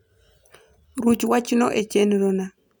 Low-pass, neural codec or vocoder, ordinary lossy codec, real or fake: none; none; none; real